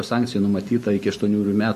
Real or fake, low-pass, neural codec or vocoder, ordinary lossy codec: real; 14.4 kHz; none; MP3, 64 kbps